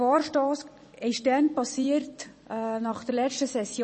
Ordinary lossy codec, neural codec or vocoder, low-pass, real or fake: MP3, 32 kbps; vocoder, 44.1 kHz, 128 mel bands every 256 samples, BigVGAN v2; 10.8 kHz; fake